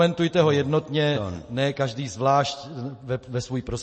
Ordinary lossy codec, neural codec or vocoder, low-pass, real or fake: MP3, 32 kbps; none; 10.8 kHz; real